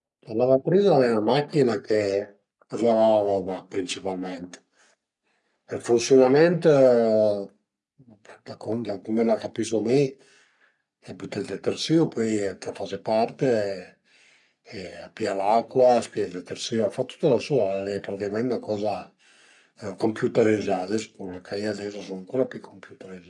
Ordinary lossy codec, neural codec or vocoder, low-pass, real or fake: none; codec, 44.1 kHz, 3.4 kbps, Pupu-Codec; 10.8 kHz; fake